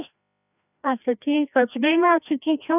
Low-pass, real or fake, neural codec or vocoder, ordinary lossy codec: 3.6 kHz; fake; codec, 16 kHz, 1 kbps, FreqCodec, larger model; none